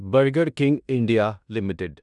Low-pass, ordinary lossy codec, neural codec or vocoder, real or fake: 10.8 kHz; none; codec, 16 kHz in and 24 kHz out, 0.9 kbps, LongCat-Audio-Codec, fine tuned four codebook decoder; fake